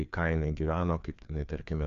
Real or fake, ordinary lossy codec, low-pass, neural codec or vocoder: fake; AAC, 64 kbps; 7.2 kHz; codec, 16 kHz, 2 kbps, FreqCodec, larger model